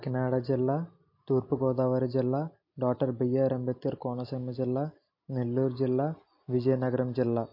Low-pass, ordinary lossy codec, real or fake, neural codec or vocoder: 5.4 kHz; MP3, 32 kbps; real; none